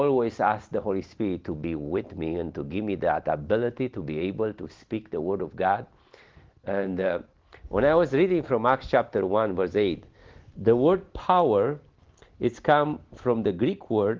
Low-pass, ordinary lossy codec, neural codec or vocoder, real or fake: 7.2 kHz; Opus, 16 kbps; none; real